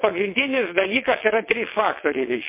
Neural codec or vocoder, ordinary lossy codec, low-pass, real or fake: vocoder, 22.05 kHz, 80 mel bands, WaveNeXt; MP3, 24 kbps; 3.6 kHz; fake